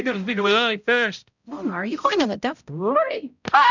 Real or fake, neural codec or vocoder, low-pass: fake; codec, 16 kHz, 0.5 kbps, X-Codec, HuBERT features, trained on balanced general audio; 7.2 kHz